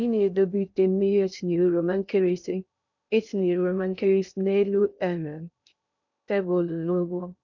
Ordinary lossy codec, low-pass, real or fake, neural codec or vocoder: none; 7.2 kHz; fake; codec, 16 kHz in and 24 kHz out, 0.6 kbps, FocalCodec, streaming, 2048 codes